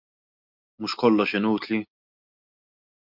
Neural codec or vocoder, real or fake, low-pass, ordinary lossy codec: none; real; 5.4 kHz; MP3, 48 kbps